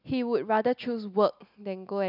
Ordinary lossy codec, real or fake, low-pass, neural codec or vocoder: none; real; 5.4 kHz; none